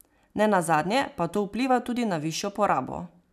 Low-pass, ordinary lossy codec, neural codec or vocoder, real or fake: 14.4 kHz; none; none; real